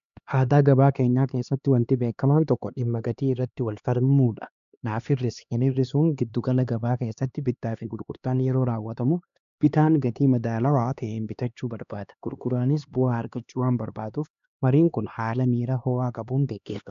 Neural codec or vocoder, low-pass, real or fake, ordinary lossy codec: codec, 16 kHz, 2 kbps, X-Codec, HuBERT features, trained on LibriSpeech; 7.2 kHz; fake; MP3, 96 kbps